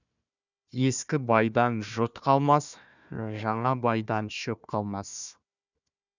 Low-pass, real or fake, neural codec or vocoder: 7.2 kHz; fake; codec, 16 kHz, 1 kbps, FunCodec, trained on Chinese and English, 50 frames a second